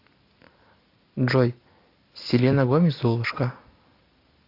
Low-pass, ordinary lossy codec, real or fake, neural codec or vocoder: 5.4 kHz; AAC, 32 kbps; real; none